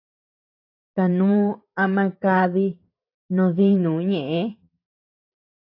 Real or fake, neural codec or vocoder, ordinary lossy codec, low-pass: fake; vocoder, 44.1 kHz, 128 mel bands every 512 samples, BigVGAN v2; AAC, 32 kbps; 5.4 kHz